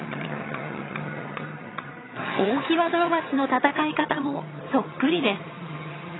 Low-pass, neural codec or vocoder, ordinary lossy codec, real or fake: 7.2 kHz; vocoder, 22.05 kHz, 80 mel bands, HiFi-GAN; AAC, 16 kbps; fake